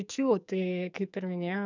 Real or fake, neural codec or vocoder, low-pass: fake; codec, 44.1 kHz, 2.6 kbps, SNAC; 7.2 kHz